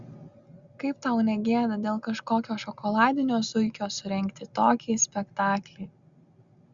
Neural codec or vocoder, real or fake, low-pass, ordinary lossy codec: none; real; 7.2 kHz; Opus, 64 kbps